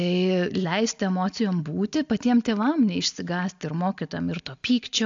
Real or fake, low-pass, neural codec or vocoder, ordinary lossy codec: real; 7.2 kHz; none; AAC, 64 kbps